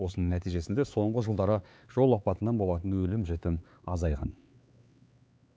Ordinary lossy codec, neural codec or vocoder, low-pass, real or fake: none; codec, 16 kHz, 4 kbps, X-Codec, HuBERT features, trained on LibriSpeech; none; fake